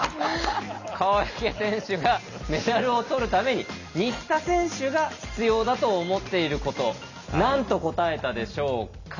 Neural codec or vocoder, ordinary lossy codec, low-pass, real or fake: none; none; 7.2 kHz; real